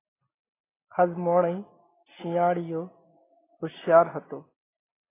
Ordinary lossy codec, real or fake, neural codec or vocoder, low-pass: AAC, 16 kbps; real; none; 3.6 kHz